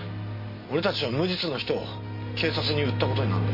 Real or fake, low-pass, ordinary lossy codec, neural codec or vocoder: real; 5.4 kHz; MP3, 24 kbps; none